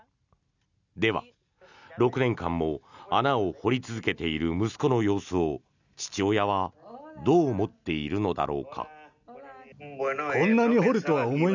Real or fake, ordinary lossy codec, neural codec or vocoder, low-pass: real; none; none; 7.2 kHz